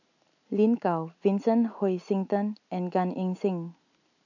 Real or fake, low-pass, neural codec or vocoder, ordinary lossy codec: real; 7.2 kHz; none; none